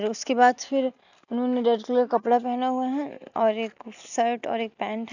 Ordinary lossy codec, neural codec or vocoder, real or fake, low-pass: none; none; real; 7.2 kHz